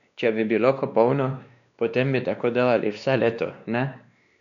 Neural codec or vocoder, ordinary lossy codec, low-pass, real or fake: codec, 16 kHz, 2 kbps, X-Codec, WavLM features, trained on Multilingual LibriSpeech; none; 7.2 kHz; fake